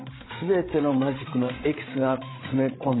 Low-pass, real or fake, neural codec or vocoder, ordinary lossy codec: 7.2 kHz; fake; codec, 16 kHz, 16 kbps, FreqCodec, larger model; AAC, 16 kbps